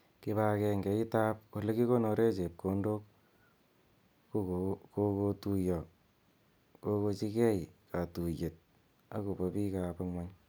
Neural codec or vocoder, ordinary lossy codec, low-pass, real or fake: none; none; none; real